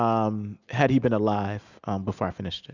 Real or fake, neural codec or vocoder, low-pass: real; none; 7.2 kHz